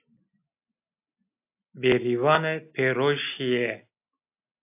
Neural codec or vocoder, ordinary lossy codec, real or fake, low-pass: none; AAC, 32 kbps; real; 3.6 kHz